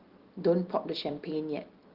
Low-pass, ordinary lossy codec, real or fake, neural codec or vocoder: 5.4 kHz; Opus, 16 kbps; real; none